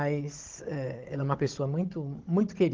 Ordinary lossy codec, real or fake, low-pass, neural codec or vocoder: Opus, 16 kbps; fake; 7.2 kHz; codec, 16 kHz, 16 kbps, FunCodec, trained on Chinese and English, 50 frames a second